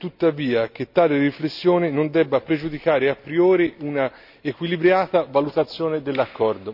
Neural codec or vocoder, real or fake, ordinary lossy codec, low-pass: none; real; none; 5.4 kHz